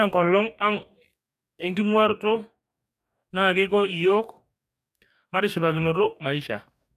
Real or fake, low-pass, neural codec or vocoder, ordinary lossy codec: fake; 14.4 kHz; codec, 44.1 kHz, 2.6 kbps, DAC; none